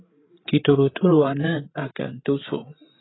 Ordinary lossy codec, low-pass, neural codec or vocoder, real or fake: AAC, 16 kbps; 7.2 kHz; codec, 16 kHz, 8 kbps, FreqCodec, larger model; fake